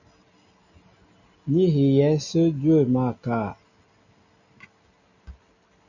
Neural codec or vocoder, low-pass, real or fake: none; 7.2 kHz; real